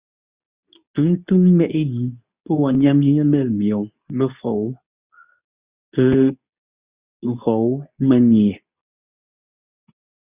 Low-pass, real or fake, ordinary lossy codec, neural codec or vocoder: 3.6 kHz; fake; Opus, 64 kbps; codec, 24 kHz, 0.9 kbps, WavTokenizer, medium speech release version 2